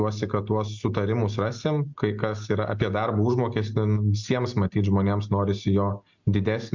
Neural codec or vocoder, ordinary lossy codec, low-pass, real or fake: none; MP3, 64 kbps; 7.2 kHz; real